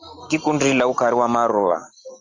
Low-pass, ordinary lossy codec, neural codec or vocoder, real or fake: 7.2 kHz; Opus, 32 kbps; none; real